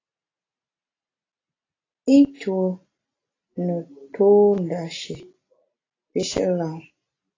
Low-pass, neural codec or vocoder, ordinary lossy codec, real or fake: 7.2 kHz; none; AAC, 32 kbps; real